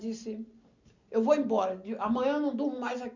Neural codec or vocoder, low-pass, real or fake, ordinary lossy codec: none; 7.2 kHz; real; none